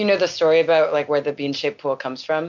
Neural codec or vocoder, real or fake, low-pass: none; real; 7.2 kHz